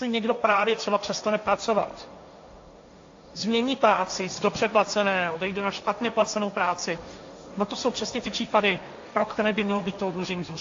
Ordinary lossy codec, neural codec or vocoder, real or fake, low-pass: AAC, 48 kbps; codec, 16 kHz, 1.1 kbps, Voila-Tokenizer; fake; 7.2 kHz